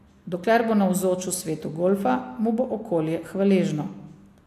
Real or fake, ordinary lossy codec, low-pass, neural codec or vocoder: real; AAC, 64 kbps; 14.4 kHz; none